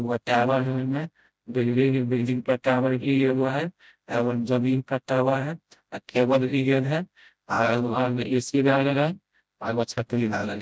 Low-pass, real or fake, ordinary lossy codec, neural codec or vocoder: none; fake; none; codec, 16 kHz, 0.5 kbps, FreqCodec, smaller model